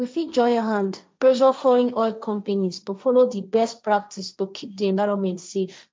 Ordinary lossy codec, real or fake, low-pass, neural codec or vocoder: none; fake; none; codec, 16 kHz, 1.1 kbps, Voila-Tokenizer